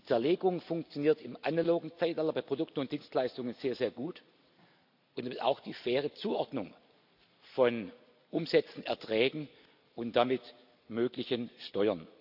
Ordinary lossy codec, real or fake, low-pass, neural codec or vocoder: AAC, 48 kbps; real; 5.4 kHz; none